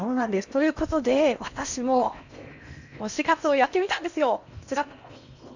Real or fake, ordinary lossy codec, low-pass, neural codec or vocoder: fake; none; 7.2 kHz; codec, 16 kHz in and 24 kHz out, 0.8 kbps, FocalCodec, streaming, 65536 codes